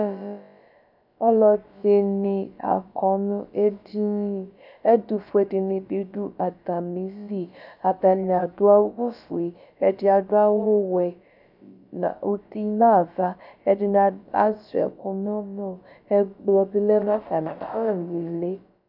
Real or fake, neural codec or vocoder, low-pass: fake; codec, 16 kHz, about 1 kbps, DyCAST, with the encoder's durations; 5.4 kHz